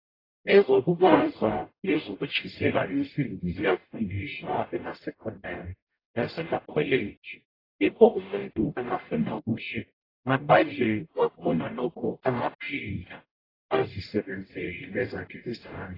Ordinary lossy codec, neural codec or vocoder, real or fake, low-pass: AAC, 24 kbps; codec, 44.1 kHz, 0.9 kbps, DAC; fake; 5.4 kHz